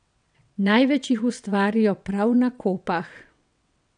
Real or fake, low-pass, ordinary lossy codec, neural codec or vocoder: fake; 9.9 kHz; none; vocoder, 22.05 kHz, 80 mel bands, WaveNeXt